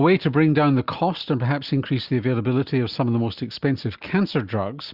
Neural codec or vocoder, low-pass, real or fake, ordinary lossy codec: none; 5.4 kHz; real; Opus, 64 kbps